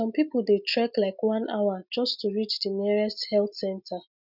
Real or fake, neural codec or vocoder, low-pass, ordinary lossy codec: real; none; 5.4 kHz; none